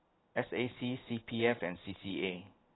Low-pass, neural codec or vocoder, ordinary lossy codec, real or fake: 7.2 kHz; none; AAC, 16 kbps; real